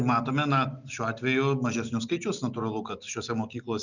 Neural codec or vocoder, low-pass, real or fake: none; 7.2 kHz; real